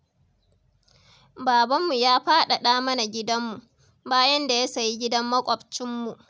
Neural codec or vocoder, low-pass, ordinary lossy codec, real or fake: none; none; none; real